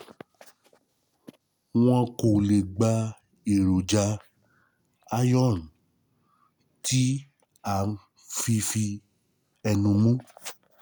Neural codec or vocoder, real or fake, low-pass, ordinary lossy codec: none; real; none; none